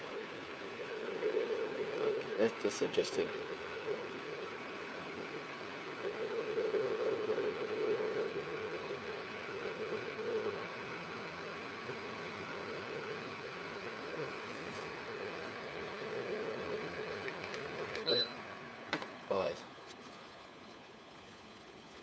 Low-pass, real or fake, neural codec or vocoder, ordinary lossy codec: none; fake; codec, 16 kHz, 4 kbps, FunCodec, trained on LibriTTS, 50 frames a second; none